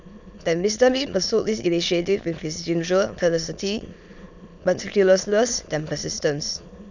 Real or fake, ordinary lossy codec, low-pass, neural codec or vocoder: fake; none; 7.2 kHz; autoencoder, 22.05 kHz, a latent of 192 numbers a frame, VITS, trained on many speakers